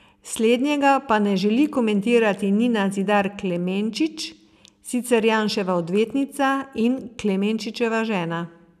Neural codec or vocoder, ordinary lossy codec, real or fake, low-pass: none; none; real; 14.4 kHz